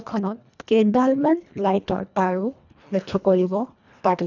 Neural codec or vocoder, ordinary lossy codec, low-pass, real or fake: codec, 24 kHz, 1.5 kbps, HILCodec; none; 7.2 kHz; fake